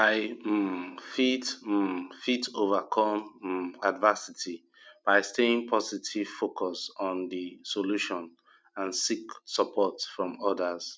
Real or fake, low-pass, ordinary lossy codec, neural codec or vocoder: real; none; none; none